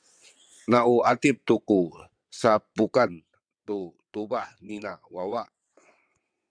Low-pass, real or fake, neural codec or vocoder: 9.9 kHz; fake; vocoder, 22.05 kHz, 80 mel bands, WaveNeXt